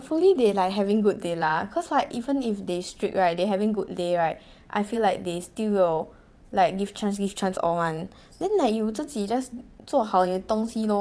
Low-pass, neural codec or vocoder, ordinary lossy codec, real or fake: none; vocoder, 22.05 kHz, 80 mel bands, WaveNeXt; none; fake